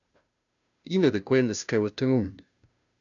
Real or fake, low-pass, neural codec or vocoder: fake; 7.2 kHz; codec, 16 kHz, 0.5 kbps, FunCodec, trained on Chinese and English, 25 frames a second